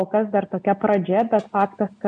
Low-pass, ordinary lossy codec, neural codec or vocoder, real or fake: 9.9 kHz; MP3, 64 kbps; none; real